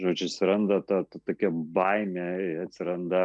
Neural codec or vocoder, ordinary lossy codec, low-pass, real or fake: none; MP3, 96 kbps; 10.8 kHz; real